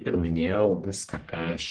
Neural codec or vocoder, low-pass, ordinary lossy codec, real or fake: codec, 44.1 kHz, 1.7 kbps, Pupu-Codec; 9.9 kHz; Opus, 16 kbps; fake